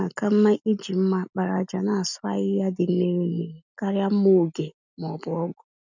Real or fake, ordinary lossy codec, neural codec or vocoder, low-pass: real; none; none; 7.2 kHz